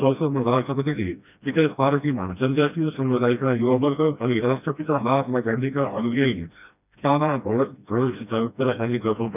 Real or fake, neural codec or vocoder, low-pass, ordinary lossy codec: fake; codec, 16 kHz, 1 kbps, FreqCodec, smaller model; 3.6 kHz; none